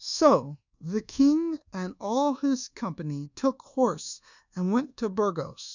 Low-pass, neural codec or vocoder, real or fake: 7.2 kHz; codec, 24 kHz, 1.2 kbps, DualCodec; fake